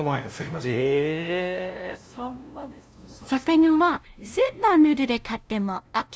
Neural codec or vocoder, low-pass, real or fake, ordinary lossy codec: codec, 16 kHz, 0.5 kbps, FunCodec, trained on LibriTTS, 25 frames a second; none; fake; none